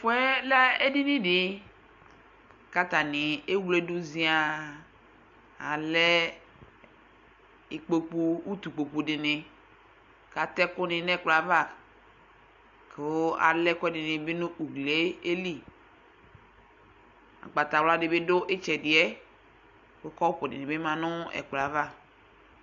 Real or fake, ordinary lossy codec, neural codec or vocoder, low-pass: real; AAC, 64 kbps; none; 7.2 kHz